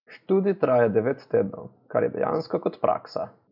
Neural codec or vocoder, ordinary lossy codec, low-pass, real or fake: none; none; 5.4 kHz; real